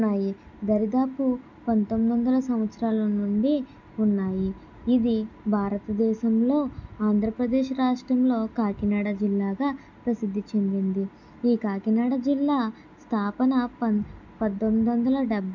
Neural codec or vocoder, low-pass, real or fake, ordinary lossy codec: none; 7.2 kHz; real; none